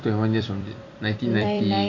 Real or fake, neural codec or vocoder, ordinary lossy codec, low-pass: fake; vocoder, 44.1 kHz, 128 mel bands every 256 samples, BigVGAN v2; AAC, 48 kbps; 7.2 kHz